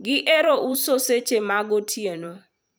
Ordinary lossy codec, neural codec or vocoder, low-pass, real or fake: none; vocoder, 44.1 kHz, 128 mel bands every 512 samples, BigVGAN v2; none; fake